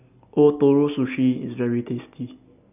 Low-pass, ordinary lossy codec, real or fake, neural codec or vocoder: 3.6 kHz; none; real; none